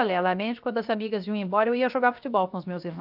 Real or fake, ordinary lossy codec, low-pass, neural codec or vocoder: fake; AAC, 48 kbps; 5.4 kHz; codec, 16 kHz, 0.7 kbps, FocalCodec